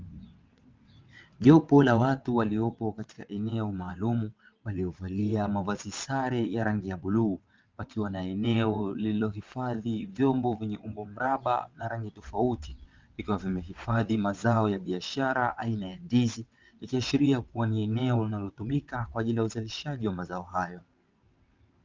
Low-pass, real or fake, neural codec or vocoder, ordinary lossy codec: 7.2 kHz; fake; vocoder, 22.05 kHz, 80 mel bands, WaveNeXt; Opus, 24 kbps